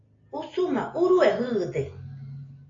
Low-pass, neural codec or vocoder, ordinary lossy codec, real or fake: 7.2 kHz; none; AAC, 32 kbps; real